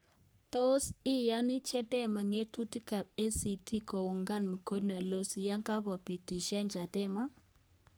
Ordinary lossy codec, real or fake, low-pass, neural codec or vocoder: none; fake; none; codec, 44.1 kHz, 3.4 kbps, Pupu-Codec